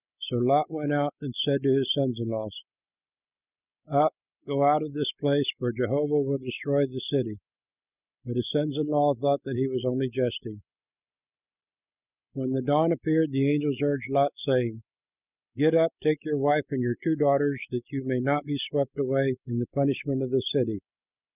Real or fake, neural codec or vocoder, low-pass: real; none; 3.6 kHz